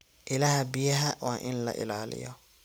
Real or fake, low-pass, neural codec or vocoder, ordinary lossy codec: real; none; none; none